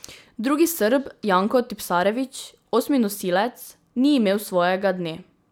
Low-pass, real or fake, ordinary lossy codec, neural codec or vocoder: none; real; none; none